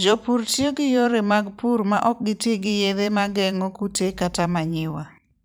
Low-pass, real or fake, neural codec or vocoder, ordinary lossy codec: none; fake; vocoder, 44.1 kHz, 128 mel bands every 512 samples, BigVGAN v2; none